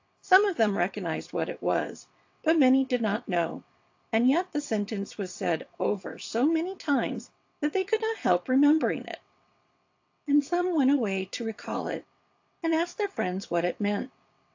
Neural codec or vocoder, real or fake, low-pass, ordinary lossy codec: vocoder, 22.05 kHz, 80 mel bands, WaveNeXt; fake; 7.2 kHz; AAC, 48 kbps